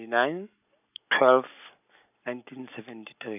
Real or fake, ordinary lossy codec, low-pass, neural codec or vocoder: real; none; 3.6 kHz; none